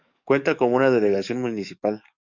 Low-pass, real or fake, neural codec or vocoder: 7.2 kHz; fake; codec, 44.1 kHz, 7.8 kbps, DAC